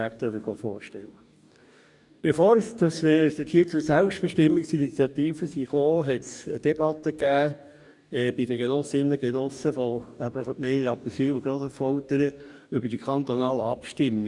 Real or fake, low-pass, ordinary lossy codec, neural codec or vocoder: fake; 10.8 kHz; none; codec, 44.1 kHz, 2.6 kbps, DAC